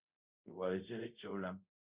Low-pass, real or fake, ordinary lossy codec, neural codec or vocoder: 3.6 kHz; fake; Opus, 64 kbps; codec, 24 kHz, 0.5 kbps, DualCodec